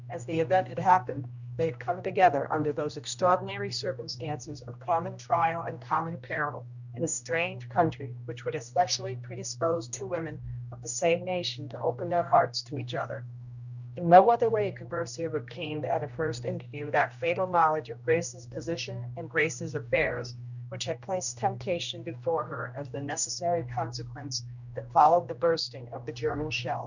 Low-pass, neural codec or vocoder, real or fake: 7.2 kHz; codec, 16 kHz, 1 kbps, X-Codec, HuBERT features, trained on general audio; fake